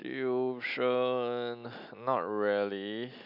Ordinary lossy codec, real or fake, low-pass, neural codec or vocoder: none; real; 5.4 kHz; none